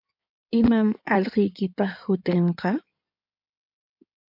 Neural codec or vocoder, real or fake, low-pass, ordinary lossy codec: codec, 16 kHz in and 24 kHz out, 2.2 kbps, FireRedTTS-2 codec; fake; 5.4 kHz; MP3, 48 kbps